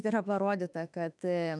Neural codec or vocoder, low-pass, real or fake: autoencoder, 48 kHz, 32 numbers a frame, DAC-VAE, trained on Japanese speech; 10.8 kHz; fake